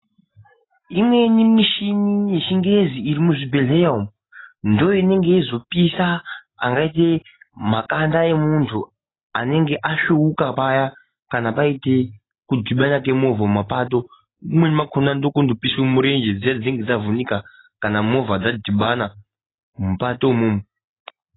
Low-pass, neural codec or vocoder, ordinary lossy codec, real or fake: 7.2 kHz; none; AAC, 16 kbps; real